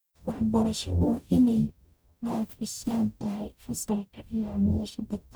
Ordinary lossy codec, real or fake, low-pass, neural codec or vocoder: none; fake; none; codec, 44.1 kHz, 0.9 kbps, DAC